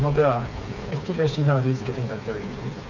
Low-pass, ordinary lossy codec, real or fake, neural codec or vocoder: 7.2 kHz; none; fake; codec, 16 kHz, 4 kbps, FreqCodec, smaller model